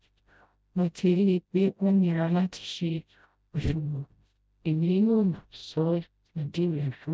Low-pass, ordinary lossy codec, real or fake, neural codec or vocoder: none; none; fake; codec, 16 kHz, 0.5 kbps, FreqCodec, smaller model